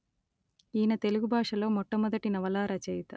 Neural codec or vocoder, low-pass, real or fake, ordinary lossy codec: none; none; real; none